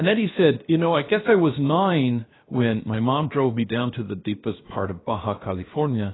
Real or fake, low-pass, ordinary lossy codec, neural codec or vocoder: fake; 7.2 kHz; AAC, 16 kbps; codec, 16 kHz, about 1 kbps, DyCAST, with the encoder's durations